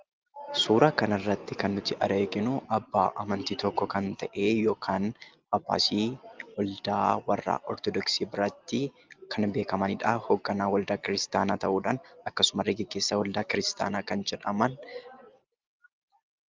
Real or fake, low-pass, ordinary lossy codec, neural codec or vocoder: real; 7.2 kHz; Opus, 32 kbps; none